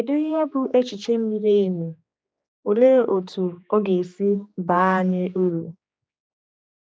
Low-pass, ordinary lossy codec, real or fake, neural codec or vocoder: none; none; fake; codec, 16 kHz, 2 kbps, X-Codec, HuBERT features, trained on general audio